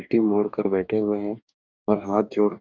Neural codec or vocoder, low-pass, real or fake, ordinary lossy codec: codec, 44.1 kHz, 2.6 kbps, DAC; 7.2 kHz; fake; none